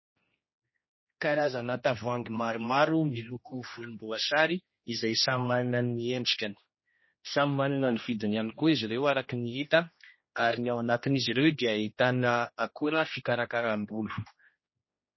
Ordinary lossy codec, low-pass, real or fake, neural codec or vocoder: MP3, 24 kbps; 7.2 kHz; fake; codec, 16 kHz, 1 kbps, X-Codec, HuBERT features, trained on general audio